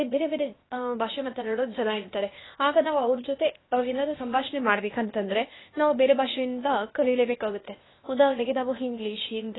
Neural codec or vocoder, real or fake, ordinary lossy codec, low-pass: codec, 16 kHz, 0.8 kbps, ZipCodec; fake; AAC, 16 kbps; 7.2 kHz